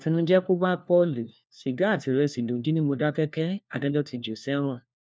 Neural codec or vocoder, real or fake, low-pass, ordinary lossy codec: codec, 16 kHz, 1 kbps, FunCodec, trained on LibriTTS, 50 frames a second; fake; none; none